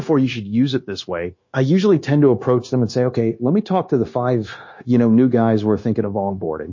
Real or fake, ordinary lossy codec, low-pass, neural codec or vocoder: fake; MP3, 32 kbps; 7.2 kHz; codec, 16 kHz, 0.9 kbps, LongCat-Audio-Codec